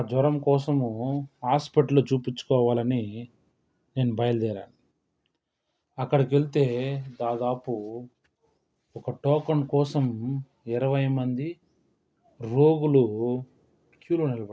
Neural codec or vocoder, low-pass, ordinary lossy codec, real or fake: none; none; none; real